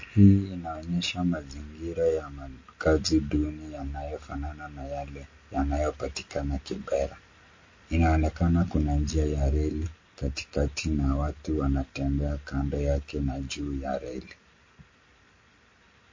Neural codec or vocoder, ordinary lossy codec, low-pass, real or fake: none; MP3, 32 kbps; 7.2 kHz; real